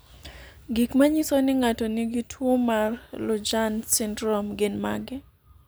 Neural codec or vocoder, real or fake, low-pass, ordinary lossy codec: none; real; none; none